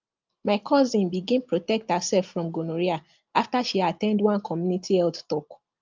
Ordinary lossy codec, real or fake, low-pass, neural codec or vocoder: Opus, 24 kbps; real; 7.2 kHz; none